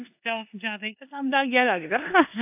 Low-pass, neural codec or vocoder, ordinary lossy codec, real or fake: 3.6 kHz; codec, 16 kHz in and 24 kHz out, 0.9 kbps, LongCat-Audio-Codec, four codebook decoder; AAC, 32 kbps; fake